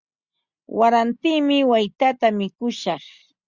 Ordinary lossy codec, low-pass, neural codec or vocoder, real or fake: Opus, 64 kbps; 7.2 kHz; none; real